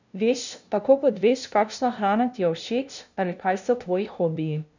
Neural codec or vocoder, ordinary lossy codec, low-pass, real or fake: codec, 16 kHz, 0.5 kbps, FunCodec, trained on LibriTTS, 25 frames a second; none; 7.2 kHz; fake